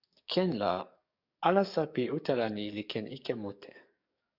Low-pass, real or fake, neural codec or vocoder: 5.4 kHz; fake; codec, 44.1 kHz, 7.8 kbps, DAC